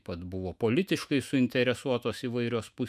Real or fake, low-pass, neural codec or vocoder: fake; 14.4 kHz; autoencoder, 48 kHz, 128 numbers a frame, DAC-VAE, trained on Japanese speech